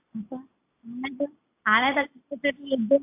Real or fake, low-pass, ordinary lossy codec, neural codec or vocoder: real; 3.6 kHz; AAC, 32 kbps; none